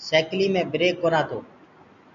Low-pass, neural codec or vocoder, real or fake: 7.2 kHz; none; real